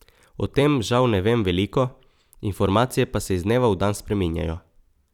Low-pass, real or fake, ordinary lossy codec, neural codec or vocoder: 19.8 kHz; fake; none; vocoder, 44.1 kHz, 128 mel bands every 256 samples, BigVGAN v2